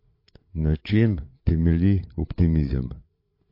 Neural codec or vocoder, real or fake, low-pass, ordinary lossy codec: codec, 16 kHz, 8 kbps, FreqCodec, larger model; fake; 5.4 kHz; MP3, 32 kbps